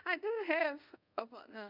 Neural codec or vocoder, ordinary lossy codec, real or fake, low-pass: codec, 24 kHz, 0.5 kbps, DualCodec; none; fake; 5.4 kHz